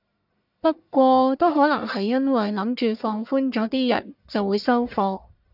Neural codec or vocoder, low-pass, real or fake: codec, 44.1 kHz, 1.7 kbps, Pupu-Codec; 5.4 kHz; fake